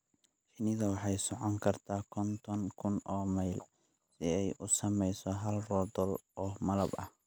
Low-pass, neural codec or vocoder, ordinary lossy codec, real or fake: none; none; none; real